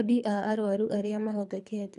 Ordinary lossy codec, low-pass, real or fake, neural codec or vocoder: none; 10.8 kHz; fake; codec, 24 kHz, 3 kbps, HILCodec